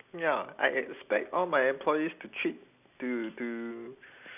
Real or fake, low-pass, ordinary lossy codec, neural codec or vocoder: real; 3.6 kHz; none; none